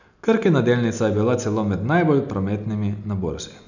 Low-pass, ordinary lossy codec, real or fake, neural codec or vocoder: 7.2 kHz; none; real; none